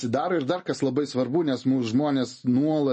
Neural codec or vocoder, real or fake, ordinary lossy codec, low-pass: none; real; MP3, 32 kbps; 10.8 kHz